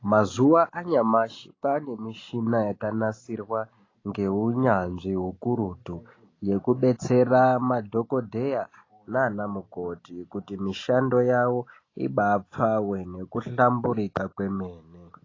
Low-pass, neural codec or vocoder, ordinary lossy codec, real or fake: 7.2 kHz; none; AAC, 32 kbps; real